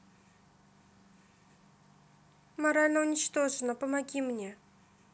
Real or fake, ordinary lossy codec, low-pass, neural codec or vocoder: real; none; none; none